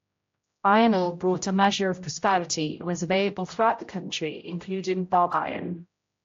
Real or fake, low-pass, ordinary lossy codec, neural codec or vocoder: fake; 7.2 kHz; AAC, 48 kbps; codec, 16 kHz, 0.5 kbps, X-Codec, HuBERT features, trained on general audio